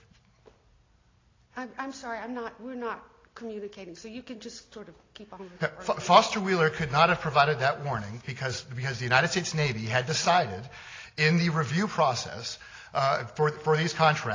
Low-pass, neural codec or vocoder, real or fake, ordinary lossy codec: 7.2 kHz; none; real; AAC, 32 kbps